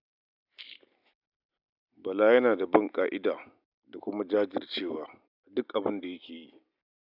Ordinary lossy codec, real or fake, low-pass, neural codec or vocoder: none; real; 5.4 kHz; none